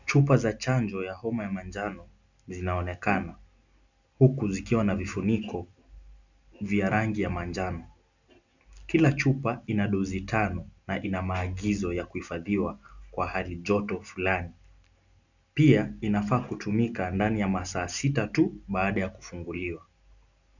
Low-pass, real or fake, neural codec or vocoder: 7.2 kHz; real; none